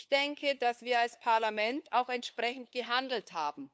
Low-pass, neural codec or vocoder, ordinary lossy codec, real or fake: none; codec, 16 kHz, 2 kbps, FunCodec, trained on LibriTTS, 25 frames a second; none; fake